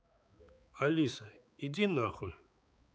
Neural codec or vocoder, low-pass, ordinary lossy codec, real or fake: codec, 16 kHz, 4 kbps, X-Codec, HuBERT features, trained on balanced general audio; none; none; fake